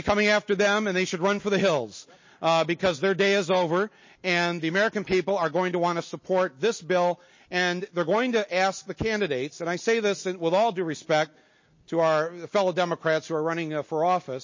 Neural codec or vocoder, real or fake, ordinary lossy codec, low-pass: none; real; MP3, 32 kbps; 7.2 kHz